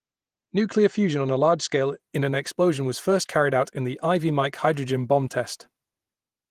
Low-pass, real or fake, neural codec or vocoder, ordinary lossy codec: 14.4 kHz; fake; vocoder, 48 kHz, 128 mel bands, Vocos; Opus, 24 kbps